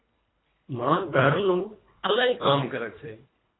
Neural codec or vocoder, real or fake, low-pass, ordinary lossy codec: codec, 24 kHz, 1.5 kbps, HILCodec; fake; 7.2 kHz; AAC, 16 kbps